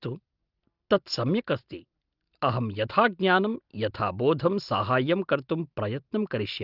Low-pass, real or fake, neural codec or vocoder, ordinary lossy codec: 5.4 kHz; real; none; Opus, 32 kbps